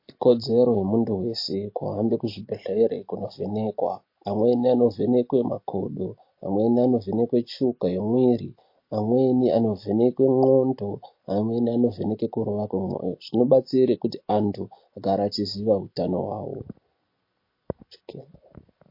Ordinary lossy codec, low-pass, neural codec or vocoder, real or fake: MP3, 32 kbps; 5.4 kHz; none; real